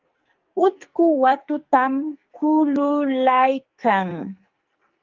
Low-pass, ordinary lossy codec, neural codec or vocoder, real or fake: 7.2 kHz; Opus, 32 kbps; codec, 44.1 kHz, 2.6 kbps, SNAC; fake